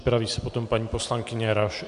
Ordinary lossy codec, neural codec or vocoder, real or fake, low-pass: MP3, 64 kbps; none; real; 10.8 kHz